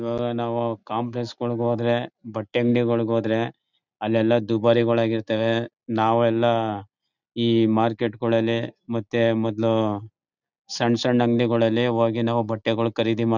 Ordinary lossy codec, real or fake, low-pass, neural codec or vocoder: none; real; 7.2 kHz; none